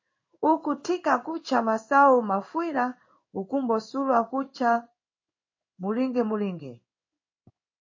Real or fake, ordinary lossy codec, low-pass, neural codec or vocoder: fake; MP3, 32 kbps; 7.2 kHz; codec, 16 kHz in and 24 kHz out, 1 kbps, XY-Tokenizer